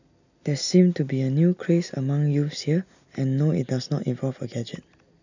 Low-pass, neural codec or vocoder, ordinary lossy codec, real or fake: 7.2 kHz; none; none; real